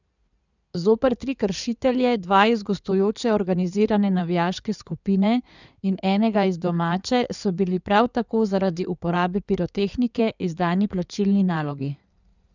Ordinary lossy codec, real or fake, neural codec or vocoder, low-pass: none; fake; codec, 16 kHz in and 24 kHz out, 2.2 kbps, FireRedTTS-2 codec; 7.2 kHz